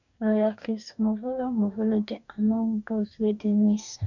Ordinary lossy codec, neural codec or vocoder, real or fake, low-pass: none; codec, 44.1 kHz, 2.6 kbps, DAC; fake; 7.2 kHz